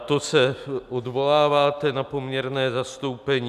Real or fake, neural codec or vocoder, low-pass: real; none; 14.4 kHz